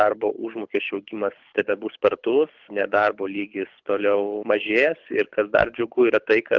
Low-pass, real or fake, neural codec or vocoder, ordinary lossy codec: 7.2 kHz; fake; codec, 24 kHz, 6 kbps, HILCodec; Opus, 32 kbps